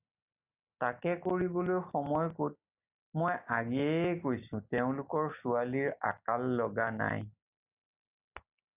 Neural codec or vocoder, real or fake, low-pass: none; real; 3.6 kHz